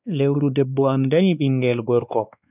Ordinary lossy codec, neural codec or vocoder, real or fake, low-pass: none; codec, 16 kHz, 2 kbps, X-Codec, WavLM features, trained on Multilingual LibriSpeech; fake; 3.6 kHz